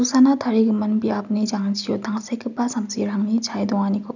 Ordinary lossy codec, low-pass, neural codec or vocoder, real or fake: none; 7.2 kHz; none; real